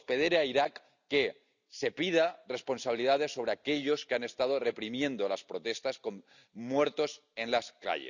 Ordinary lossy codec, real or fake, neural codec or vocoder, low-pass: none; real; none; 7.2 kHz